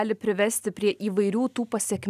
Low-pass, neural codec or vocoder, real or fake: 14.4 kHz; none; real